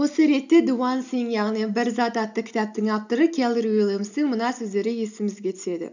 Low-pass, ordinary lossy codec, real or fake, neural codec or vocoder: 7.2 kHz; none; real; none